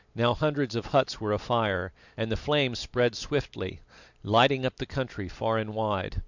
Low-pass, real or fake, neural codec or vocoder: 7.2 kHz; real; none